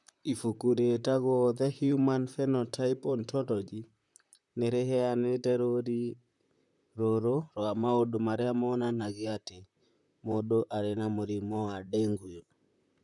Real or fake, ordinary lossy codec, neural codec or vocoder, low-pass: fake; none; vocoder, 44.1 kHz, 128 mel bands, Pupu-Vocoder; 10.8 kHz